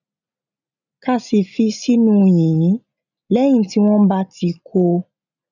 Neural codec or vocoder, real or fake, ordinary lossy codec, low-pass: none; real; none; 7.2 kHz